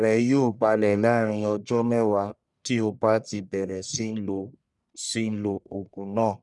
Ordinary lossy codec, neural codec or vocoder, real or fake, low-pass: none; codec, 44.1 kHz, 1.7 kbps, Pupu-Codec; fake; 10.8 kHz